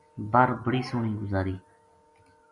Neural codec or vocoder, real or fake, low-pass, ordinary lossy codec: none; real; 10.8 kHz; MP3, 64 kbps